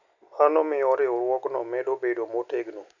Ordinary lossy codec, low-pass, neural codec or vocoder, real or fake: none; 7.2 kHz; none; real